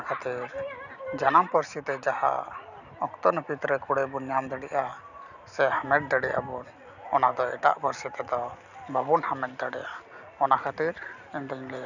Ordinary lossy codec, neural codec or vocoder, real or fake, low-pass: none; none; real; 7.2 kHz